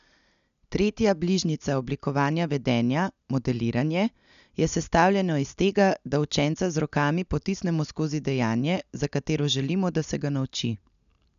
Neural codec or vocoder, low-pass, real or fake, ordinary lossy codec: none; 7.2 kHz; real; none